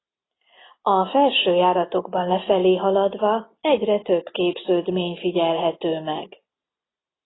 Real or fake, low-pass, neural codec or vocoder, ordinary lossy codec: real; 7.2 kHz; none; AAC, 16 kbps